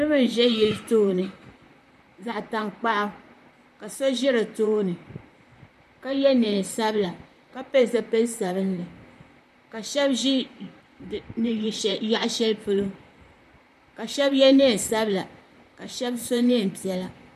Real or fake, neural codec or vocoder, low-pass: fake; vocoder, 44.1 kHz, 128 mel bands, Pupu-Vocoder; 14.4 kHz